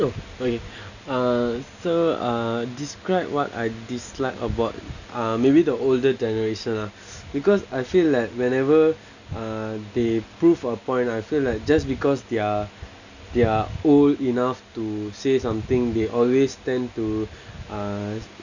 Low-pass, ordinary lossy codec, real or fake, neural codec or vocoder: 7.2 kHz; none; real; none